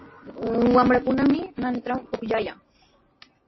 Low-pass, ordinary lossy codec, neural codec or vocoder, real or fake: 7.2 kHz; MP3, 24 kbps; none; real